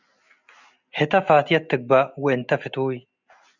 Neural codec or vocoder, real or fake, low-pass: none; real; 7.2 kHz